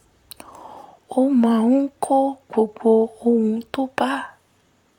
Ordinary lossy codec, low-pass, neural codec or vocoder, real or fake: none; 19.8 kHz; vocoder, 44.1 kHz, 128 mel bands, Pupu-Vocoder; fake